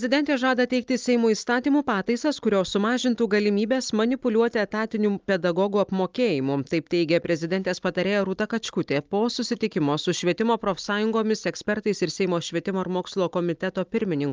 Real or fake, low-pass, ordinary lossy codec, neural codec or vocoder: real; 7.2 kHz; Opus, 24 kbps; none